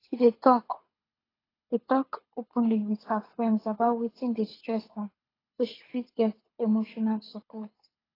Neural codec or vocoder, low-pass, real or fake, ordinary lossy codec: codec, 24 kHz, 6 kbps, HILCodec; 5.4 kHz; fake; AAC, 24 kbps